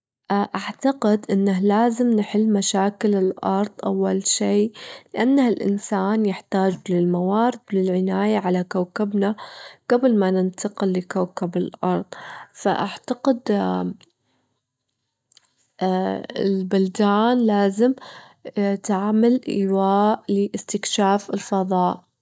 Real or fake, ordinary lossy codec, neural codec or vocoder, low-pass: real; none; none; none